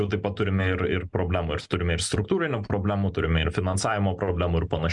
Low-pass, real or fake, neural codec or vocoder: 10.8 kHz; real; none